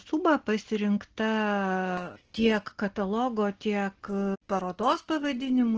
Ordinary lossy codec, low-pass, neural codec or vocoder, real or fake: Opus, 16 kbps; 7.2 kHz; none; real